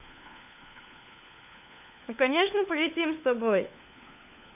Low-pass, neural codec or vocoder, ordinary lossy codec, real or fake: 3.6 kHz; codec, 16 kHz, 4 kbps, FunCodec, trained on LibriTTS, 50 frames a second; none; fake